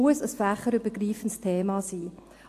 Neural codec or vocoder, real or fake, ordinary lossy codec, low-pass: none; real; AAC, 64 kbps; 14.4 kHz